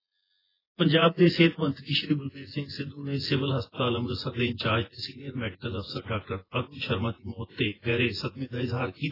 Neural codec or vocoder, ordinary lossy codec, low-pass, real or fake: vocoder, 24 kHz, 100 mel bands, Vocos; AAC, 24 kbps; 5.4 kHz; fake